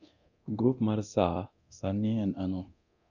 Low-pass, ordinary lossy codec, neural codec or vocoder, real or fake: 7.2 kHz; none; codec, 24 kHz, 0.9 kbps, DualCodec; fake